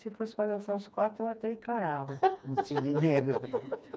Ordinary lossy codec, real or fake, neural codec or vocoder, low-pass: none; fake; codec, 16 kHz, 2 kbps, FreqCodec, smaller model; none